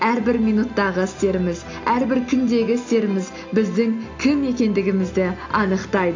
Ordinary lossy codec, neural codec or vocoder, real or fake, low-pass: AAC, 48 kbps; none; real; 7.2 kHz